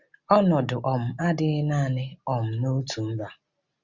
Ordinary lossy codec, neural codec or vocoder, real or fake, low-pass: Opus, 64 kbps; none; real; 7.2 kHz